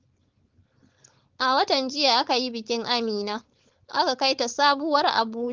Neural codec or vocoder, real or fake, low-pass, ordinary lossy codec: codec, 16 kHz, 4.8 kbps, FACodec; fake; 7.2 kHz; Opus, 16 kbps